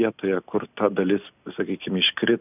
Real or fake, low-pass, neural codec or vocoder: real; 3.6 kHz; none